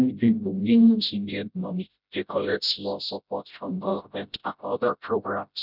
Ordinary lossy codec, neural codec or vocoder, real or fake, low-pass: none; codec, 16 kHz, 0.5 kbps, FreqCodec, smaller model; fake; 5.4 kHz